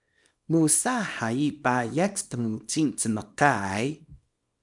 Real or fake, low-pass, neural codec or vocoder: fake; 10.8 kHz; codec, 24 kHz, 0.9 kbps, WavTokenizer, small release